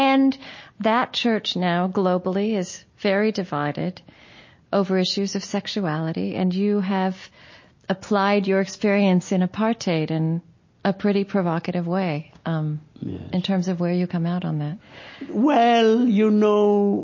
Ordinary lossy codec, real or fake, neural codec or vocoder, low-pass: MP3, 32 kbps; real; none; 7.2 kHz